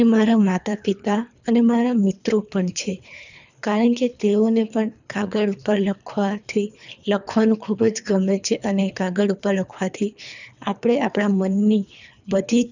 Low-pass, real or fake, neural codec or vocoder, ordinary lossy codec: 7.2 kHz; fake; codec, 24 kHz, 3 kbps, HILCodec; none